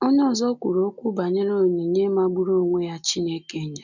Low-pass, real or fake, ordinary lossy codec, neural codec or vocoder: 7.2 kHz; real; none; none